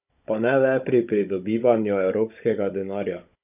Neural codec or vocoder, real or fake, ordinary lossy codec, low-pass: codec, 16 kHz, 16 kbps, FunCodec, trained on Chinese and English, 50 frames a second; fake; none; 3.6 kHz